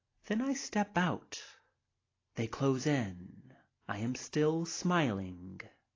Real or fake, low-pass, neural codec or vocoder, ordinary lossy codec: real; 7.2 kHz; none; AAC, 32 kbps